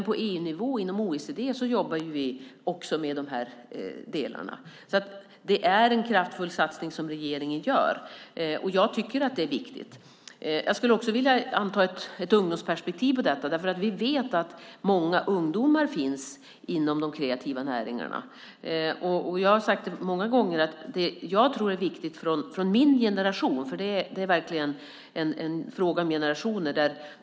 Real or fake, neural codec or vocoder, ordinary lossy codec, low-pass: real; none; none; none